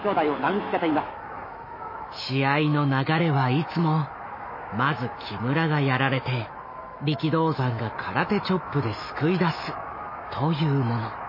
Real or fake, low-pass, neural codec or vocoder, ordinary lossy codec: fake; 5.4 kHz; autoencoder, 48 kHz, 128 numbers a frame, DAC-VAE, trained on Japanese speech; MP3, 24 kbps